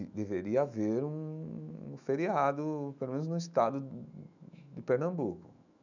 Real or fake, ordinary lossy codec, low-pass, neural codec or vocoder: fake; none; 7.2 kHz; autoencoder, 48 kHz, 128 numbers a frame, DAC-VAE, trained on Japanese speech